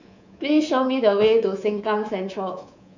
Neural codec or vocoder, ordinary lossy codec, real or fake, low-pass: codec, 24 kHz, 3.1 kbps, DualCodec; none; fake; 7.2 kHz